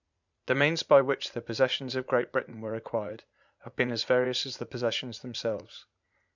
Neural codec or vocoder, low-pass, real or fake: vocoder, 44.1 kHz, 80 mel bands, Vocos; 7.2 kHz; fake